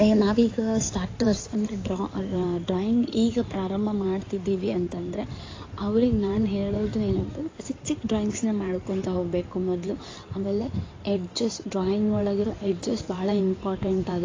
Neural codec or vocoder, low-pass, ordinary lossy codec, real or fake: codec, 16 kHz in and 24 kHz out, 2.2 kbps, FireRedTTS-2 codec; 7.2 kHz; AAC, 32 kbps; fake